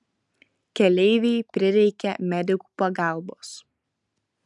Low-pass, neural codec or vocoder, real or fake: 10.8 kHz; none; real